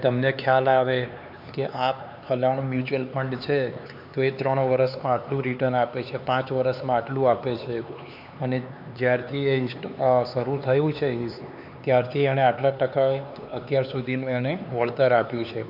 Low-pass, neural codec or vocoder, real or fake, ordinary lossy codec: 5.4 kHz; codec, 16 kHz, 4 kbps, X-Codec, HuBERT features, trained on LibriSpeech; fake; MP3, 48 kbps